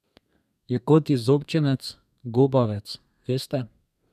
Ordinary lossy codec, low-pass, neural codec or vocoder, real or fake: none; 14.4 kHz; codec, 32 kHz, 1.9 kbps, SNAC; fake